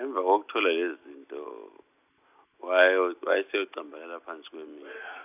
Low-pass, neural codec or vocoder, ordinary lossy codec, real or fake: 3.6 kHz; none; none; real